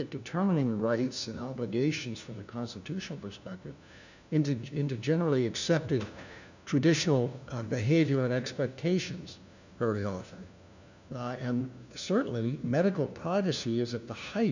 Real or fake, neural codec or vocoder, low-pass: fake; codec, 16 kHz, 1 kbps, FunCodec, trained on LibriTTS, 50 frames a second; 7.2 kHz